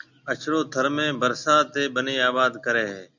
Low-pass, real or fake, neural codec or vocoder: 7.2 kHz; real; none